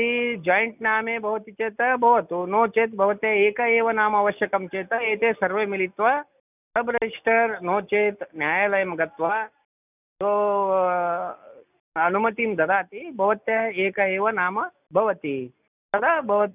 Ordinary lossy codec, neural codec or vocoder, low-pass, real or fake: none; none; 3.6 kHz; real